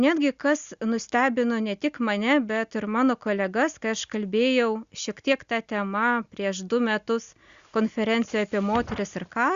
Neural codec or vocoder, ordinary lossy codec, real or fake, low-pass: none; Opus, 64 kbps; real; 7.2 kHz